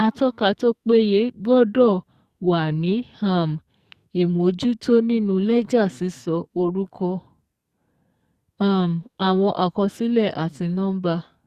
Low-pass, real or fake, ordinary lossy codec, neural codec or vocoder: 14.4 kHz; fake; Opus, 64 kbps; codec, 44.1 kHz, 2.6 kbps, SNAC